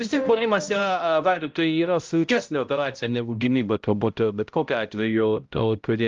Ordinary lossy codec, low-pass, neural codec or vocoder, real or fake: Opus, 32 kbps; 7.2 kHz; codec, 16 kHz, 0.5 kbps, X-Codec, HuBERT features, trained on balanced general audio; fake